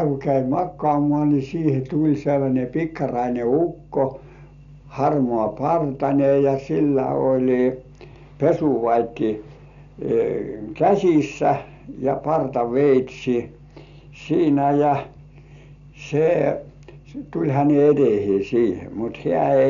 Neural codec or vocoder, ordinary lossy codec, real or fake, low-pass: none; none; real; 7.2 kHz